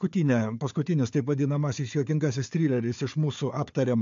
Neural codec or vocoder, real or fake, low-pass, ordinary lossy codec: codec, 16 kHz, 4 kbps, FunCodec, trained on Chinese and English, 50 frames a second; fake; 7.2 kHz; MP3, 64 kbps